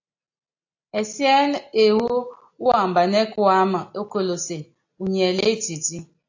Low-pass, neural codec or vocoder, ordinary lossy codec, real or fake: 7.2 kHz; none; AAC, 48 kbps; real